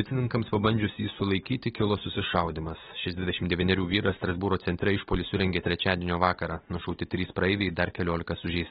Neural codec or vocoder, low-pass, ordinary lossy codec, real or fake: none; 10.8 kHz; AAC, 16 kbps; real